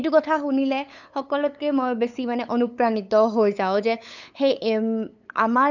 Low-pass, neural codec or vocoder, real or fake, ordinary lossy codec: 7.2 kHz; codec, 16 kHz, 8 kbps, FunCodec, trained on LibriTTS, 25 frames a second; fake; none